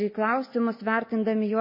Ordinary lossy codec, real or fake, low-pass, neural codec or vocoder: MP3, 24 kbps; real; 5.4 kHz; none